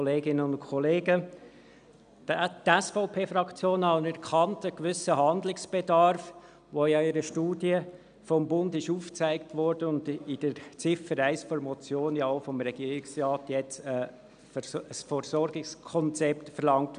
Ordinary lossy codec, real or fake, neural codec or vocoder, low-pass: none; real; none; 9.9 kHz